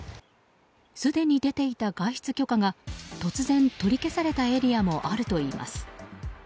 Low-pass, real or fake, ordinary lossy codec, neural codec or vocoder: none; real; none; none